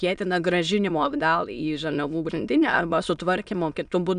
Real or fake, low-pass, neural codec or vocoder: fake; 9.9 kHz; autoencoder, 22.05 kHz, a latent of 192 numbers a frame, VITS, trained on many speakers